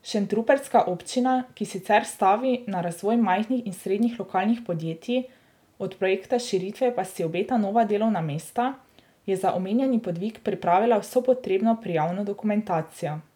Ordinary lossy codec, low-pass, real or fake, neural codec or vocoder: none; 19.8 kHz; real; none